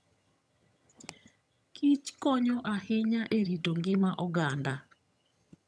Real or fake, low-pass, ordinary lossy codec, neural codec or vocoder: fake; none; none; vocoder, 22.05 kHz, 80 mel bands, HiFi-GAN